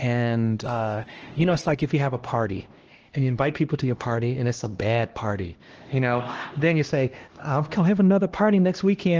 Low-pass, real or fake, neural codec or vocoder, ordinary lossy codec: 7.2 kHz; fake; codec, 16 kHz, 1 kbps, X-Codec, HuBERT features, trained on LibriSpeech; Opus, 16 kbps